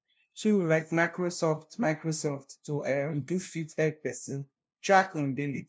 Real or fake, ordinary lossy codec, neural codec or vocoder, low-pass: fake; none; codec, 16 kHz, 0.5 kbps, FunCodec, trained on LibriTTS, 25 frames a second; none